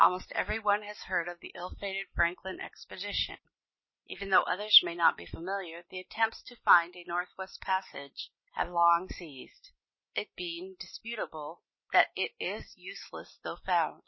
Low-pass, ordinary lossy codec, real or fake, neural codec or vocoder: 7.2 kHz; MP3, 24 kbps; real; none